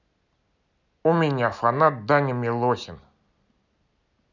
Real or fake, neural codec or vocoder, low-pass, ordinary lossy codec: real; none; 7.2 kHz; none